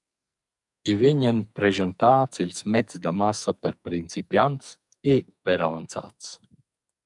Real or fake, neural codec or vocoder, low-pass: fake; codec, 44.1 kHz, 2.6 kbps, SNAC; 10.8 kHz